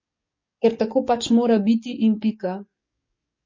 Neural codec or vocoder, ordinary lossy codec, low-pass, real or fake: codec, 44.1 kHz, 7.8 kbps, DAC; MP3, 32 kbps; 7.2 kHz; fake